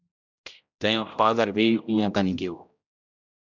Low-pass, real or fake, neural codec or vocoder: 7.2 kHz; fake; codec, 16 kHz, 0.5 kbps, X-Codec, HuBERT features, trained on general audio